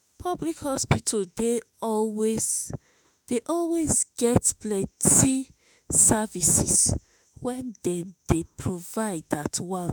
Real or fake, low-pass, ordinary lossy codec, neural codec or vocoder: fake; none; none; autoencoder, 48 kHz, 32 numbers a frame, DAC-VAE, trained on Japanese speech